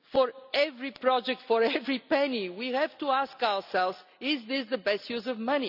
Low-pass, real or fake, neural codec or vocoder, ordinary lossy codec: 5.4 kHz; real; none; none